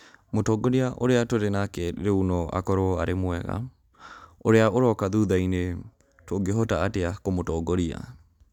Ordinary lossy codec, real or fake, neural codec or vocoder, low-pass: none; real; none; 19.8 kHz